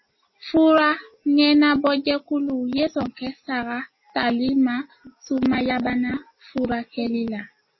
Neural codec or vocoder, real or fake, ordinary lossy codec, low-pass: none; real; MP3, 24 kbps; 7.2 kHz